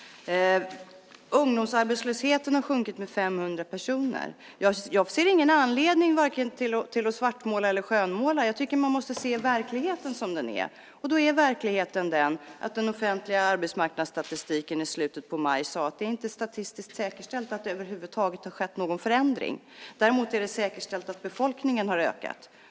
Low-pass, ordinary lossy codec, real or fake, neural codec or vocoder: none; none; real; none